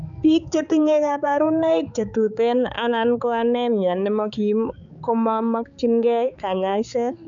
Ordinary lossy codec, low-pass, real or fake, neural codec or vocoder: none; 7.2 kHz; fake; codec, 16 kHz, 4 kbps, X-Codec, HuBERT features, trained on balanced general audio